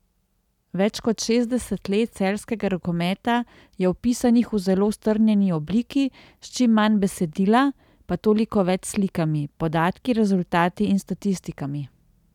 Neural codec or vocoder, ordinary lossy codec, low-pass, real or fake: none; none; 19.8 kHz; real